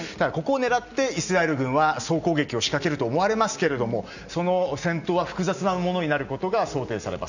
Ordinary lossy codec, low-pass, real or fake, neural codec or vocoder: none; 7.2 kHz; real; none